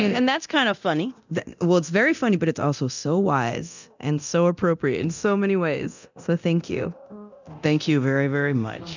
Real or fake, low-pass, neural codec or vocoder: fake; 7.2 kHz; codec, 24 kHz, 0.9 kbps, DualCodec